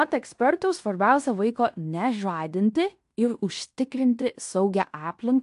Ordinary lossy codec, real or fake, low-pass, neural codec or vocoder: AAC, 64 kbps; fake; 10.8 kHz; codec, 16 kHz in and 24 kHz out, 0.9 kbps, LongCat-Audio-Codec, fine tuned four codebook decoder